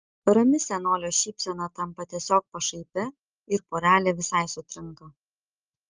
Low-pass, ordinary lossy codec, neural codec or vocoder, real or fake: 7.2 kHz; Opus, 24 kbps; none; real